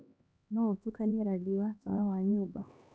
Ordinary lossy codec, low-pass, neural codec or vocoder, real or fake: none; none; codec, 16 kHz, 2 kbps, X-Codec, HuBERT features, trained on LibriSpeech; fake